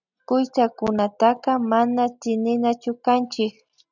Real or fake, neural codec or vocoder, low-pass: real; none; 7.2 kHz